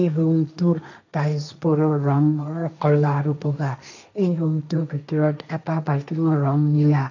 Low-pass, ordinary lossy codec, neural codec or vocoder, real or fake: 7.2 kHz; none; codec, 16 kHz, 1.1 kbps, Voila-Tokenizer; fake